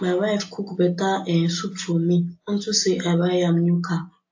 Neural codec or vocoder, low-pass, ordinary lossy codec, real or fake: none; 7.2 kHz; AAC, 48 kbps; real